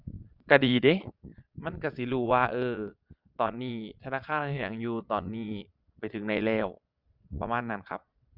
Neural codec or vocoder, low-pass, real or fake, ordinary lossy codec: vocoder, 22.05 kHz, 80 mel bands, Vocos; 5.4 kHz; fake; Opus, 64 kbps